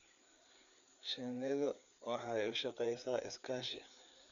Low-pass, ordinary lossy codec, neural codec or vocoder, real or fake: 7.2 kHz; none; codec, 16 kHz, 4 kbps, FreqCodec, larger model; fake